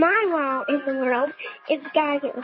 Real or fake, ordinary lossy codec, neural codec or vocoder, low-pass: fake; MP3, 24 kbps; codec, 44.1 kHz, 7.8 kbps, DAC; 7.2 kHz